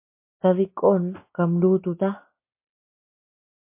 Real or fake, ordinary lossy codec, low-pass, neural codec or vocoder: real; MP3, 24 kbps; 3.6 kHz; none